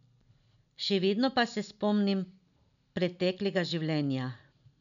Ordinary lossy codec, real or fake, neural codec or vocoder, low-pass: none; real; none; 7.2 kHz